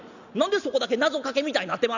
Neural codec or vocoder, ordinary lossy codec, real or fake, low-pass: none; none; real; 7.2 kHz